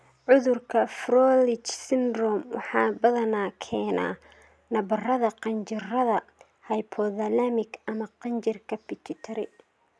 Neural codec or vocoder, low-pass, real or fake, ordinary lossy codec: none; none; real; none